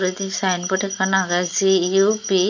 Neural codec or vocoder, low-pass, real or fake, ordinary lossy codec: none; 7.2 kHz; real; none